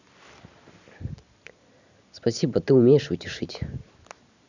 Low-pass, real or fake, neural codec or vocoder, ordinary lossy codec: 7.2 kHz; real; none; none